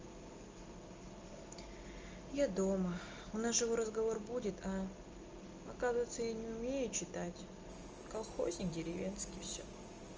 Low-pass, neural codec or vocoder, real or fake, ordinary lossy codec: 7.2 kHz; none; real; Opus, 24 kbps